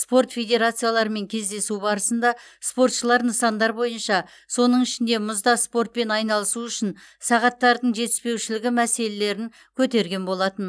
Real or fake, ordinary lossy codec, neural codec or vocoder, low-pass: real; none; none; none